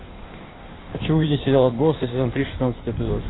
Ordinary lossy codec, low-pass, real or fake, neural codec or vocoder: AAC, 16 kbps; 7.2 kHz; fake; codec, 16 kHz in and 24 kHz out, 1.1 kbps, FireRedTTS-2 codec